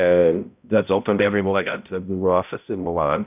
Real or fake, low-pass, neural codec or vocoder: fake; 3.6 kHz; codec, 16 kHz, 0.5 kbps, X-Codec, HuBERT features, trained on balanced general audio